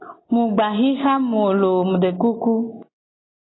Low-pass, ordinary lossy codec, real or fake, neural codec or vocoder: 7.2 kHz; AAC, 16 kbps; real; none